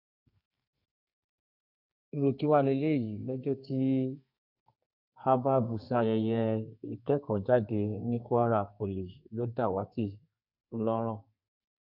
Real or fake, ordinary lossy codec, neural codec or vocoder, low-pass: fake; none; codec, 32 kHz, 1.9 kbps, SNAC; 5.4 kHz